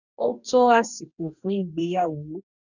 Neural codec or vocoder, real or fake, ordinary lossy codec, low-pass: codec, 44.1 kHz, 2.6 kbps, DAC; fake; none; 7.2 kHz